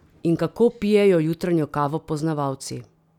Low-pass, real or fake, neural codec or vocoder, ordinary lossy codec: 19.8 kHz; real; none; none